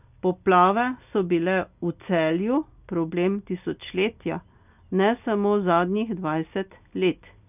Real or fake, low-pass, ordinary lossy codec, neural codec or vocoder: real; 3.6 kHz; none; none